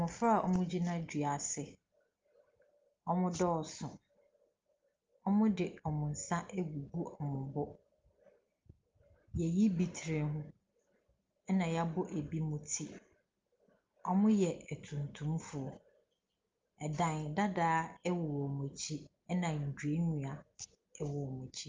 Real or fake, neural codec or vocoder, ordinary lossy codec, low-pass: real; none; Opus, 24 kbps; 7.2 kHz